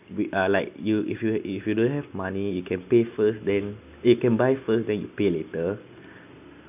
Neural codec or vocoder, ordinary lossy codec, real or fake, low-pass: none; none; real; 3.6 kHz